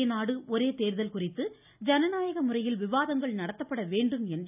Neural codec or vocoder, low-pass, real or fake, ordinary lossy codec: none; 3.6 kHz; real; none